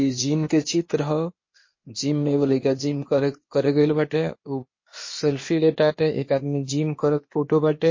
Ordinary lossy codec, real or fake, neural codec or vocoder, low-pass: MP3, 32 kbps; fake; codec, 16 kHz, 0.8 kbps, ZipCodec; 7.2 kHz